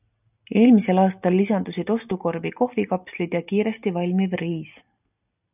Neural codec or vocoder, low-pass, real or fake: none; 3.6 kHz; real